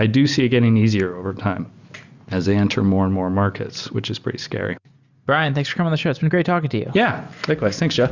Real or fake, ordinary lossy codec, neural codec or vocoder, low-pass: real; Opus, 64 kbps; none; 7.2 kHz